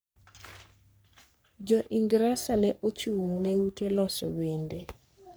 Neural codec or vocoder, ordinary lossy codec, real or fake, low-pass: codec, 44.1 kHz, 3.4 kbps, Pupu-Codec; none; fake; none